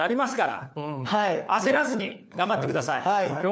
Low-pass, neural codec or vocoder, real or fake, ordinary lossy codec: none; codec, 16 kHz, 4 kbps, FunCodec, trained on LibriTTS, 50 frames a second; fake; none